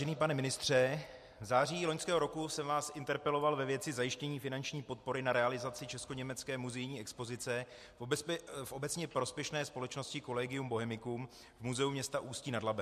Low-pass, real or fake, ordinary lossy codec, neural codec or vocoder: 14.4 kHz; real; MP3, 64 kbps; none